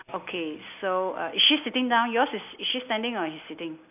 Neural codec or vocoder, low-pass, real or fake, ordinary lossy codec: none; 3.6 kHz; real; none